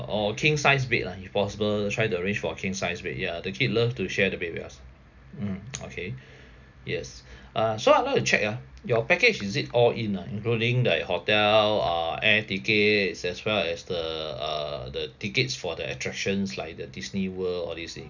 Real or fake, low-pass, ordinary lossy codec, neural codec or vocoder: real; 7.2 kHz; none; none